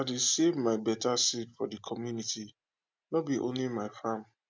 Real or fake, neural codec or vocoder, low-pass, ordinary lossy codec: real; none; none; none